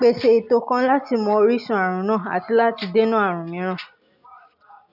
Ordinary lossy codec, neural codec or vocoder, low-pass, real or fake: none; none; 5.4 kHz; real